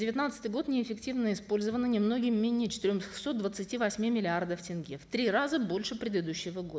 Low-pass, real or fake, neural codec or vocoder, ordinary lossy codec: none; real; none; none